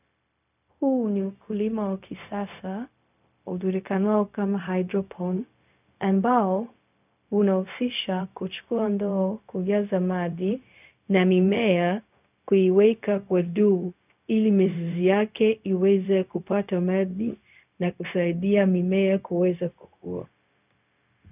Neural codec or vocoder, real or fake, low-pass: codec, 16 kHz, 0.4 kbps, LongCat-Audio-Codec; fake; 3.6 kHz